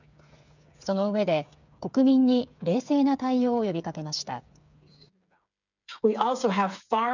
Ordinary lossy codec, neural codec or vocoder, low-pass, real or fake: none; codec, 16 kHz, 8 kbps, FreqCodec, smaller model; 7.2 kHz; fake